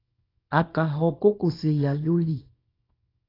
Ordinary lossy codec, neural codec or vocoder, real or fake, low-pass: AAC, 24 kbps; codec, 24 kHz, 0.9 kbps, WavTokenizer, small release; fake; 5.4 kHz